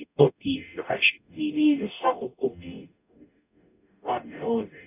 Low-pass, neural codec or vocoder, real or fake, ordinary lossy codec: 3.6 kHz; codec, 44.1 kHz, 0.9 kbps, DAC; fake; none